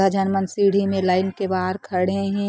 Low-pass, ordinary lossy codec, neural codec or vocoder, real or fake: none; none; none; real